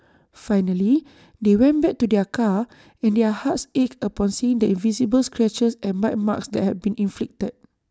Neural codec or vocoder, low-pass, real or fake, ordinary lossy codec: none; none; real; none